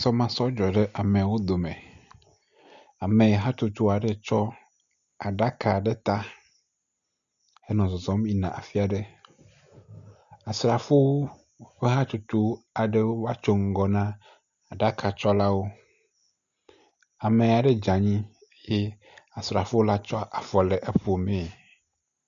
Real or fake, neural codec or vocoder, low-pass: real; none; 7.2 kHz